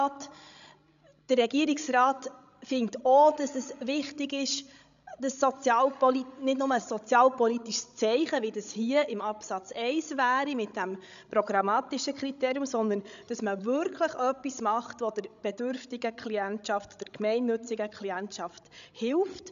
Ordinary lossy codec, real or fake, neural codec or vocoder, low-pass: none; fake; codec, 16 kHz, 16 kbps, FreqCodec, larger model; 7.2 kHz